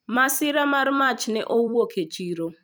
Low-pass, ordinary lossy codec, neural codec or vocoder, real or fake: none; none; vocoder, 44.1 kHz, 128 mel bands every 256 samples, BigVGAN v2; fake